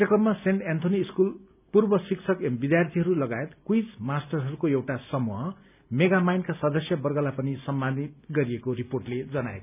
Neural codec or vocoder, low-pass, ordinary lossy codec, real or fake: none; 3.6 kHz; none; real